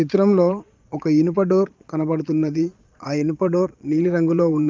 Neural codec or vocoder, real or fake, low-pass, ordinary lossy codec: vocoder, 22.05 kHz, 80 mel bands, Vocos; fake; 7.2 kHz; Opus, 32 kbps